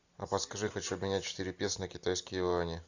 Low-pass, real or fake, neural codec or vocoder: 7.2 kHz; real; none